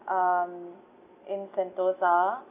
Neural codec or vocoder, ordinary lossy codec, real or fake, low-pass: none; none; real; 3.6 kHz